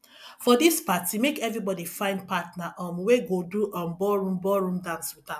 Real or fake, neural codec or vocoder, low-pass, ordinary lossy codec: real; none; 14.4 kHz; none